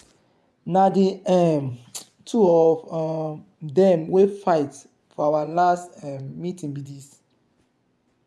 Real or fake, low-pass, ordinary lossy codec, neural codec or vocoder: fake; none; none; vocoder, 24 kHz, 100 mel bands, Vocos